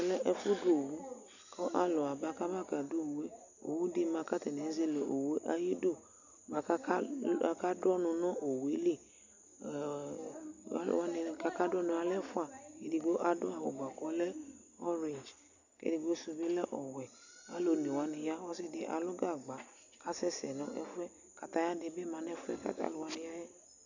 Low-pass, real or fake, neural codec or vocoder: 7.2 kHz; real; none